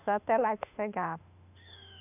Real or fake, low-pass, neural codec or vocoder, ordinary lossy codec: fake; 3.6 kHz; autoencoder, 48 kHz, 32 numbers a frame, DAC-VAE, trained on Japanese speech; none